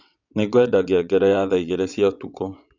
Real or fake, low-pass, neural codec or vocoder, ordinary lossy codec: fake; 7.2 kHz; vocoder, 22.05 kHz, 80 mel bands, WaveNeXt; none